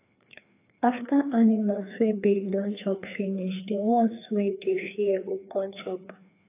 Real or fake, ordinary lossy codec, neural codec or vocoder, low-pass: fake; AAC, 24 kbps; codec, 16 kHz, 2 kbps, FreqCodec, larger model; 3.6 kHz